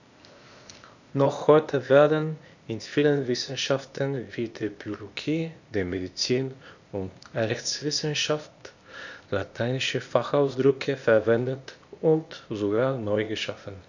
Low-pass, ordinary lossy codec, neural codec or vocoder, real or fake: 7.2 kHz; none; codec, 16 kHz, 0.8 kbps, ZipCodec; fake